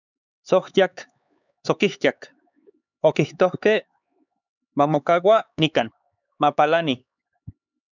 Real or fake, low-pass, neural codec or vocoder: fake; 7.2 kHz; codec, 16 kHz, 4 kbps, X-Codec, HuBERT features, trained on LibriSpeech